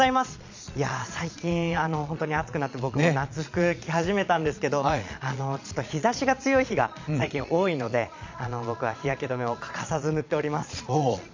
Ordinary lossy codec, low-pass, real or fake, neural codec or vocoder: MP3, 64 kbps; 7.2 kHz; fake; vocoder, 22.05 kHz, 80 mel bands, Vocos